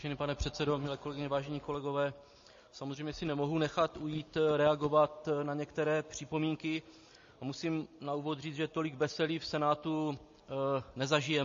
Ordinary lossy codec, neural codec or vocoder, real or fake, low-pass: MP3, 32 kbps; none; real; 7.2 kHz